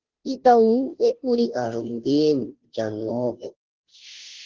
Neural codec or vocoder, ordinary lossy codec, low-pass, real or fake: codec, 16 kHz, 0.5 kbps, FunCodec, trained on Chinese and English, 25 frames a second; Opus, 16 kbps; 7.2 kHz; fake